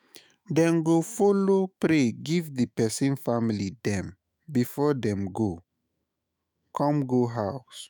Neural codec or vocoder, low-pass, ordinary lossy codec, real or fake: autoencoder, 48 kHz, 128 numbers a frame, DAC-VAE, trained on Japanese speech; none; none; fake